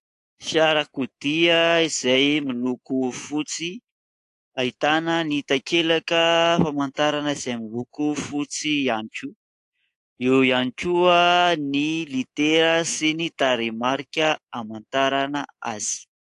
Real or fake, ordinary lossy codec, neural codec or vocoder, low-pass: fake; AAC, 48 kbps; codec, 24 kHz, 3.1 kbps, DualCodec; 10.8 kHz